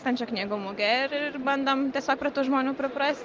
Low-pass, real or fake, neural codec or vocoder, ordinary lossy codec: 7.2 kHz; real; none; Opus, 32 kbps